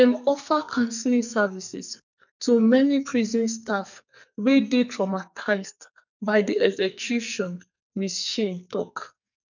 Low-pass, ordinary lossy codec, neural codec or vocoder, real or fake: 7.2 kHz; none; codec, 44.1 kHz, 2.6 kbps, SNAC; fake